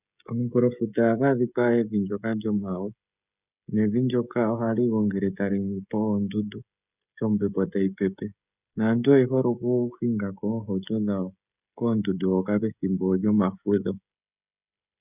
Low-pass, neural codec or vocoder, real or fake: 3.6 kHz; codec, 16 kHz, 16 kbps, FreqCodec, smaller model; fake